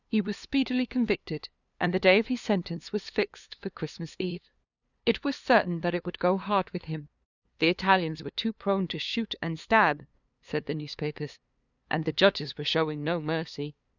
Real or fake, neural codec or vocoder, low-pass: fake; codec, 16 kHz, 2 kbps, FunCodec, trained on LibriTTS, 25 frames a second; 7.2 kHz